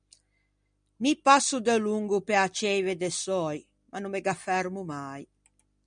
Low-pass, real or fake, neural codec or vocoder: 9.9 kHz; real; none